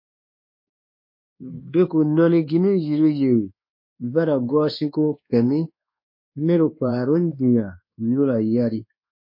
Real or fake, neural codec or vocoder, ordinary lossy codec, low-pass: fake; codec, 24 kHz, 1.2 kbps, DualCodec; MP3, 32 kbps; 5.4 kHz